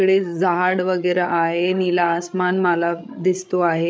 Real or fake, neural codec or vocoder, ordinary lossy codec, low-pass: fake; codec, 16 kHz, 8 kbps, FreqCodec, larger model; none; none